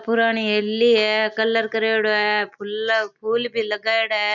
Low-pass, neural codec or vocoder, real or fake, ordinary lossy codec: 7.2 kHz; none; real; none